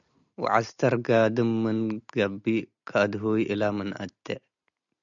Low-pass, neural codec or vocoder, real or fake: 7.2 kHz; none; real